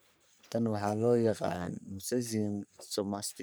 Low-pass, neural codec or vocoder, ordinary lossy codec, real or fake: none; codec, 44.1 kHz, 3.4 kbps, Pupu-Codec; none; fake